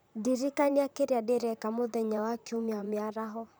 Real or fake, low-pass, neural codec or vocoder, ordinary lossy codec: fake; none; vocoder, 44.1 kHz, 128 mel bands, Pupu-Vocoder; none